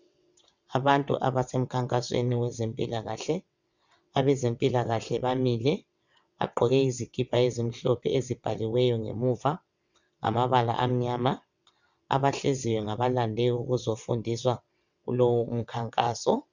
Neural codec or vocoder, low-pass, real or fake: vocoder, 22.05 kHz, 80 mel bands, WaveNeXt; 7.2 kHz; fake